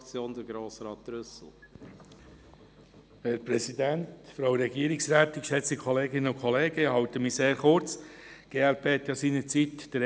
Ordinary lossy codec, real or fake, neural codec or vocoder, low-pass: none; real; none; none